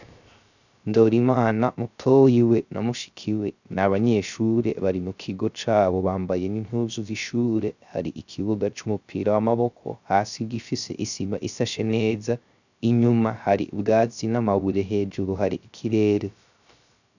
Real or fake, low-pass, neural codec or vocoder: fake; 7.2 kHz; codec, 16 kHz, 0.3 kbps, FocalCodec